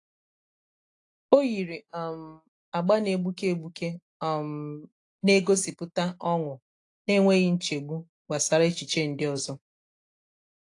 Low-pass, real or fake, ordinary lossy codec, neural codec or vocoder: 10.8 kHz; real; AAC, 48 kbps; none